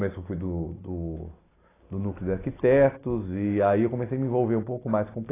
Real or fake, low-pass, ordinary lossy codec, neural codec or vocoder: real; 3.6 kHz; AAC, 16 kbps; none